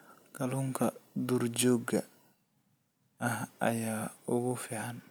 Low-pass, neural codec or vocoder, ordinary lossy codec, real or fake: none; none; none; real